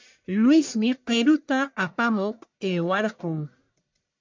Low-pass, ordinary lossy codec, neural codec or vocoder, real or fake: 7.2 kHz; MP3, 64 kbps; codec, 44.1 kHz, 1.7 kbps, Pupu-Codec; fake